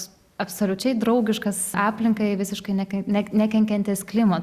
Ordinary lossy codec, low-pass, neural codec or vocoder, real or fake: Opus, 64 kbps; 14.4 kHz; none; real